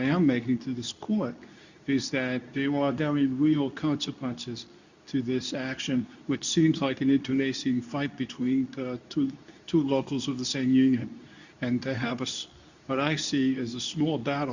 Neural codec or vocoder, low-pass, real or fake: codec, 24 kHz, 0.9 kbps, WavTokenizer, medium speech release version 2; 7.2 kHz; fake